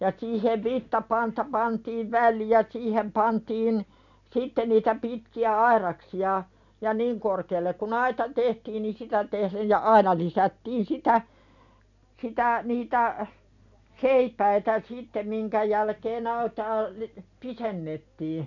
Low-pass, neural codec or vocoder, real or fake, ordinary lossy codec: 7.2 kHz; none; real; none